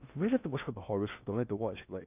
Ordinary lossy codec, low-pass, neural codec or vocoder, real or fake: none; 3.6 kHz; codec, 16 kHz in and 24 kHz out, 0.6 kbps, FocalCodec, streaming, 4096 codes; fake